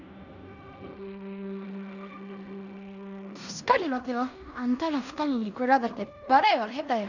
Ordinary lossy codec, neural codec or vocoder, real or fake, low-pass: none; codec, 16 kHz in and 24 kHz out, 0.9 kbps, LongCat-Audio-Codec, fine tuned four codebook decoder; fake; 7.2 kHz